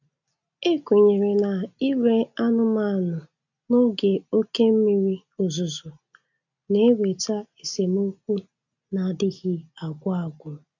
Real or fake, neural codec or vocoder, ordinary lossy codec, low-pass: real; none; none; 7.2 kHz